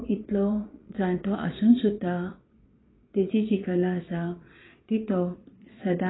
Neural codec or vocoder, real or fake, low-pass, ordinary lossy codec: vocoder, 22.05 kHz, 80 mel bands, Vocos; fake; 7.2 kHz; AAC, 16 kbps